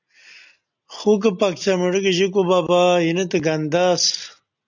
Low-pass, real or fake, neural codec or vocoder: 7.2 kHz; real; none